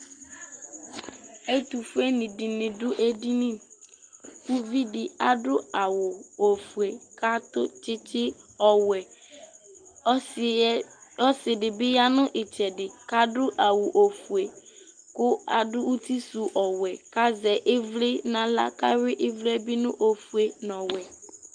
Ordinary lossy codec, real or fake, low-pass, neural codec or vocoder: Opus, 32 kbps; real; 9.9 kHz; none